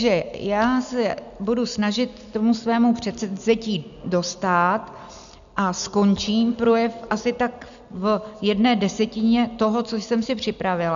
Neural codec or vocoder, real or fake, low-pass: none; real; 7.2 kHz